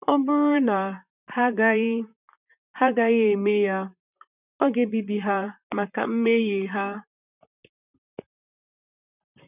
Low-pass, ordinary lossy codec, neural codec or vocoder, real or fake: 3.6 kHz; none; vocoder, 44.1 kHz, 128 mel bands, Pupu-Vocoder; fake